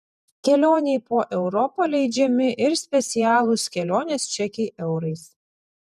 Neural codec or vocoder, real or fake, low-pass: vocoder, 48 kHz, 128 mel bands, Vocos; fake; 14.4 kHz